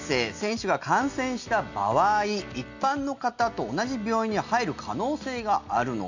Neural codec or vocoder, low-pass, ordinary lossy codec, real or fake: none; 7.2 kHz; none; real